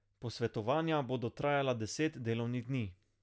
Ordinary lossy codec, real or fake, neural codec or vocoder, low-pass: none; real; none; none